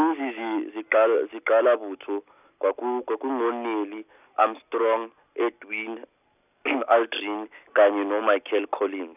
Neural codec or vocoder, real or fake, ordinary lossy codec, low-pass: none; real; none; 3.6 kHz